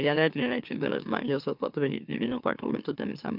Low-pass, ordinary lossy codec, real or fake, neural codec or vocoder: 5.4 kHz; none; fake; autoencoder, 44.1 kHz, a latent of 192 numbers a frame, MeloTTS